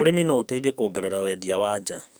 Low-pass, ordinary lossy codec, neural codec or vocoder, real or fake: none; none; codec, 44.1 kHz, 2.6 kbps, SNAC; fake